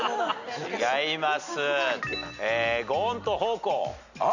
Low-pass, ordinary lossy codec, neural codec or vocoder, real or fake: 7.2 kHz; none; none; real